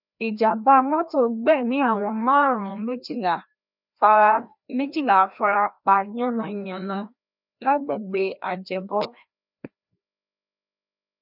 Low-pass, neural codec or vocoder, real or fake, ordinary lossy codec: 5.4 kHz; codec, 16 kHz, 1 kbps, FreqCodec, larger model; fake; none